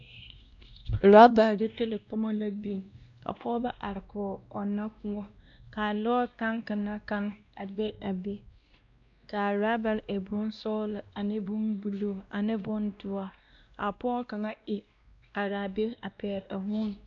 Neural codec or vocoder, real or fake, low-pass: codec, 16 kHz, 1 kbps, X-Codec, WavLM features, trained on Multilingual LibriSpeech; fake; 7.2 kHz